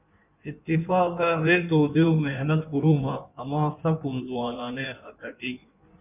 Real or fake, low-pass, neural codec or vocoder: fake; 3.6 kHz; codec, 16 kHz in and 24 kHz out, 1.1 kbps, FireRedTTS-2 codec